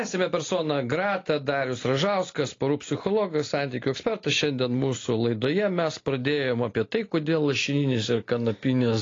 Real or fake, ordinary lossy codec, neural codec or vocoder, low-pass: real; AAC, 32 kbps; none; 7.2 kHz